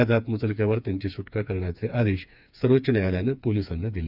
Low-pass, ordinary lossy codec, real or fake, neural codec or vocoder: 5.4 kHz; none; fake; codec, 16 kHz, 4 kbps, FreqCodec, smaller model